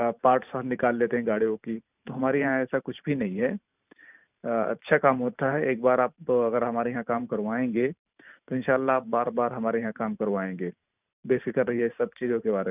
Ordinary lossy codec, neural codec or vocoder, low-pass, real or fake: none; vocoder, 44.1 kHz, 128 mel bands every 512 samples, BigVGAN v2; 3.6 kHz; fake